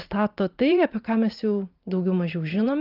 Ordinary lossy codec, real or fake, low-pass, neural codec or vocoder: Opus, 32 kbps; fake; 5.4 kHz; vocoder, 44.1 kHz, 128 mel bands every 512 samples, BigVGAN v2